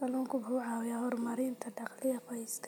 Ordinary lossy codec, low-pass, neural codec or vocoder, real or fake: none; none; none; real